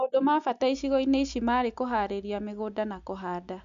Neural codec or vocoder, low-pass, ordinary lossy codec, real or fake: none; 7.2 kHz; MP3, 64 kbps; real